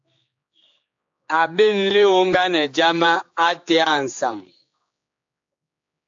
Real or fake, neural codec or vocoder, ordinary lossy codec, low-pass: fake; codec, 16 kHz, 4 kbps, X-Codec, HuBERT features, trained on general audio; AAC, 64 kbps; 7.2 kHz